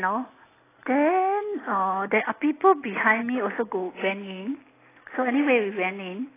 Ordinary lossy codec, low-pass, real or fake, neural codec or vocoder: AAC, 16 kbps; 3.6 kHz; real; none